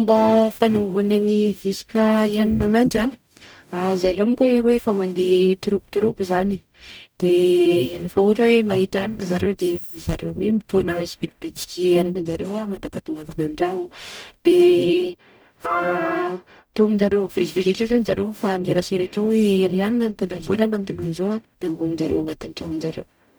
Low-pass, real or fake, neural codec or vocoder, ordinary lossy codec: none; fake; codec, 44.1 kHz, 0.9 kbps, DAC; none